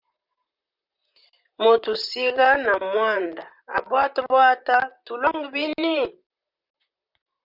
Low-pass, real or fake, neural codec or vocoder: 5.4 kHz; fake; vocoder, 44.1 kHz, 128 mel bands, Pupu-Vocoder